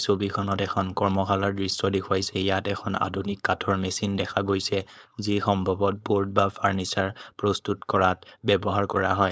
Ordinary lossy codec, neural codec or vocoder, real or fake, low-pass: none; codec, 16 kHz, 4.8 kbps, FACodec; fake; none